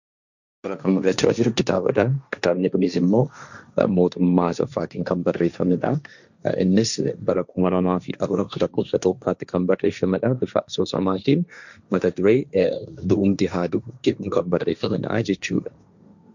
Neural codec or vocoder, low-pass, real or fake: codec, 16 kHz, 1.1 kbps, Voila-Tokenizer; 7.2 kHz; fake